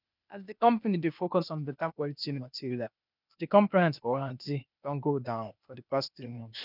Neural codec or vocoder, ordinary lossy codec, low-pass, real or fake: codec, 16 kHz, 0.8 kbps, ZipCodec; none; 5.4 kHz; fake